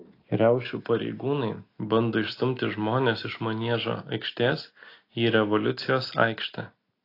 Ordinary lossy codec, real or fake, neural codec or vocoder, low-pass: AAC, 32 kbps; real; none; 5.4 kHz